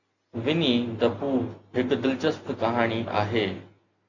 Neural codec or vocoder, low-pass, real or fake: none; 7.2 kHz; real